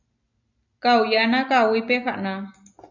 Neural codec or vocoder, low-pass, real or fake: none; 7.2 kHz; real